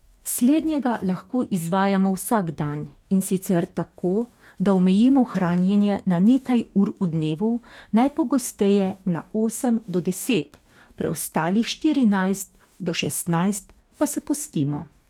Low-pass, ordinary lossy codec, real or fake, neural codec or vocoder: 19.8 kHz; none; fake; codec, 44.1 kHz, 2.6 kbps, DAC